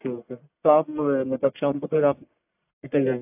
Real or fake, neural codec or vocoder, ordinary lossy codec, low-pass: fake; codec, 44.1 kHz, 1.7 kbps, Pupu-Codec; AAC, 32 kbps; 3.6 kHz